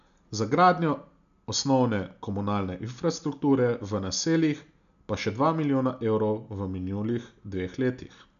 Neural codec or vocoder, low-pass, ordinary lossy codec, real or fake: none; 7.2 kHz; none; real